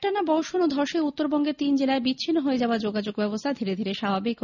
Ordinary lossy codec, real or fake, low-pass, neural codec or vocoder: none; real; 7.2 kHz; none